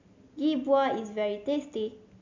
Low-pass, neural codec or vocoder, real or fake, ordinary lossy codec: 7.2 kHz; none; real; MP3, 64 kbps